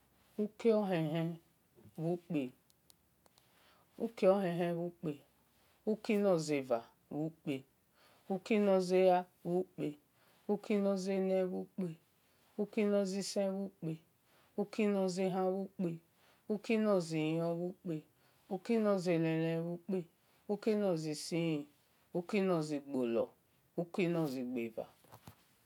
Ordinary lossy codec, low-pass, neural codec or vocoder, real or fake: none; 19.8 kHz; none; real